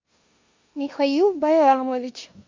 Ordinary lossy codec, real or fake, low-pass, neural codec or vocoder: MP3, 64 kbps; fake; 7.2 kHz; codec, 16 kHz in and 24 kHz out, 0.9 kbps, LongCat-Audio-Codec, four codebook decoder